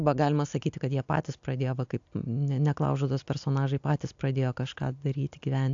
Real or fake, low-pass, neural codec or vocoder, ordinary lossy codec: real; 7.2 kHz; none; AAC, 64 kbps